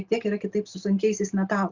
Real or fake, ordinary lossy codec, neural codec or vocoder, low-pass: real; Opus, 64 kbps; none; 7.2 kHz